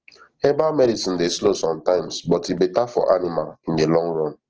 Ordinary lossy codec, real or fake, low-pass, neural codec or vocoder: Opus, 16 kbps; real; 7.2 kHz; none